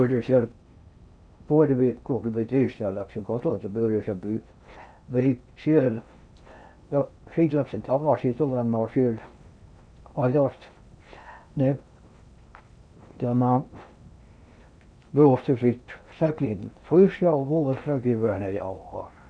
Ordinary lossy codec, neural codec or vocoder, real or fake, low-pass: none; codec, 16 kHz in and 24 kHz out, 0.6 kbps, FocalCodec, streaming, 4096 codes; fake; 9.9 kHz